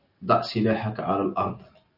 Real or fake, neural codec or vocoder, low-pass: real; none; 5.4 kHz